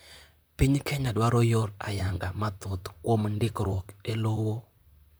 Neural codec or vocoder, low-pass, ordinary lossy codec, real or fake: vocoder, 44.1 kHz, 128 mel bands, Pupu-Vocoder; none; none; fake